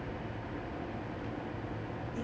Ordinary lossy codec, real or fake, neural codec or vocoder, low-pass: none; real; none; none